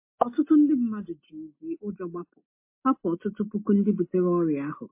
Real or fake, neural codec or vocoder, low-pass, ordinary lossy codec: real; none; 3.6 kHz; MP3, 24 kbps